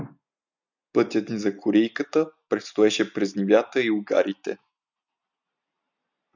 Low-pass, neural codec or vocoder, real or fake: 7.2 kHz; none; real